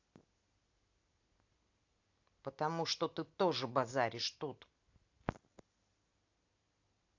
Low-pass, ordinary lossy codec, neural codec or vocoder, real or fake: 7.2 kHz; AAC, 48 kbps; none; real